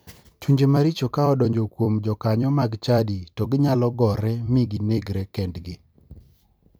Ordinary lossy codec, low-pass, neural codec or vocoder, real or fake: none; none; vocoder, 44.1 kHz, 128 mel bands every 256 samples, BigVGAN v2; fake